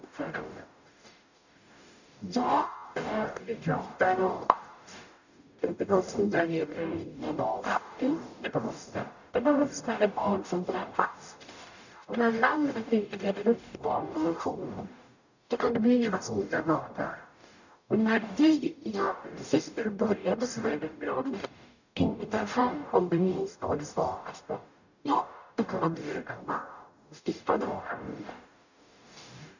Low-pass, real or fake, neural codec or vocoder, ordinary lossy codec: 7.2 kHz; fake; codec, 44.1 kHz, 0.9 kbps, DAC; none